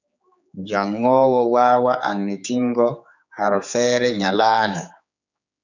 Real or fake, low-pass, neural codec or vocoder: fake; 7.2 kHz; codec, 16 kHz, 4 kbps, X-Codec, HuBERT features, trained on general audio